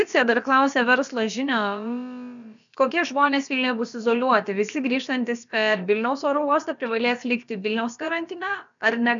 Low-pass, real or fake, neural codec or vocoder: 7.2 kHz; fake; codec, 16 kHz, about 1 kbps, DyCAST, with the encoder's durations